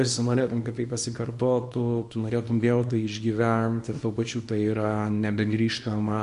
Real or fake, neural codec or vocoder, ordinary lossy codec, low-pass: fake; codec, 24 kHz, 0.9 kbps, WavTokenizer, small release; MP3, 48 kbps; 10.8 kHz